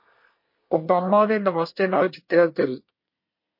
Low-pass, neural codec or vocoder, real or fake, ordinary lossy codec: 5.4 kHz; codec, 24 kHz, 1 kbps, SNAC; fake; MP3, 32 kbps